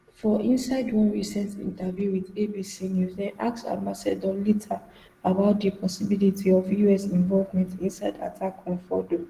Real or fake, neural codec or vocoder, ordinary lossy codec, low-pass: real; none; Opus, 16 kbps; 14.4 kHz